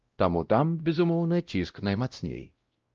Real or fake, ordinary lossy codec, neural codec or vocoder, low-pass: fake; Opus, 24 kbps; codec, 16 kHz, 0.5 kbps, X-Codec, WavLM features, trained on Multilingual LibriSpeech; 7.2 kHz